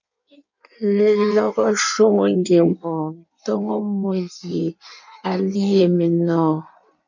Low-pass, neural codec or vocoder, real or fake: 7.2 kHz; codec, 16 kHz in and 24 kHz out, 1.1 kbps, FireRedTTS-2 codec; fake